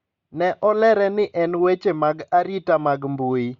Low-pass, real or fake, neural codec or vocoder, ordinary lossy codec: 7.2 kHz; real; none; Opus, 64 kbps